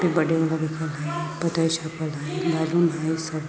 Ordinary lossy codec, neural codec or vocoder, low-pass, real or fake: none; none; none; real